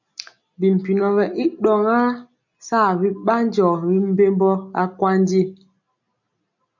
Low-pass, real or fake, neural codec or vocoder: 7.2 kHz; real; none